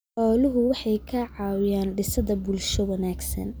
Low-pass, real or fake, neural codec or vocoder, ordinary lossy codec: none; real; none; none